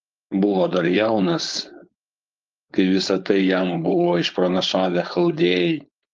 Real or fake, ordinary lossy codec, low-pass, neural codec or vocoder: fake; Opus, 24 kbps; 7.2 kHz; codec, 16 kHz, 4.8 kbps, FACodec